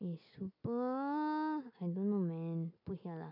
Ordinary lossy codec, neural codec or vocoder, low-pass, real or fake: none; none; 5.4 kHz; real